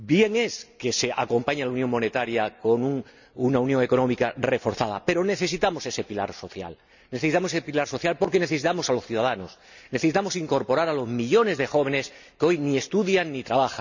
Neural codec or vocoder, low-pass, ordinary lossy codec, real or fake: none; 7.2 kHz; none; real